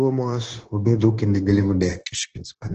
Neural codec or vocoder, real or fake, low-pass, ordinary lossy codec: codec, 16 kHz, 0.9 kbps, LongCat-Audio-Codec; fake; 7.2 kHz; Opus, 16 kbps